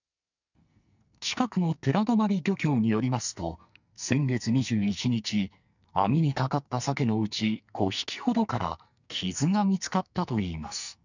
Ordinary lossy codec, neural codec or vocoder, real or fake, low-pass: none; codec, 44.1 kHz, 2.6 kbps, SNAC; fake; 7.2 kHz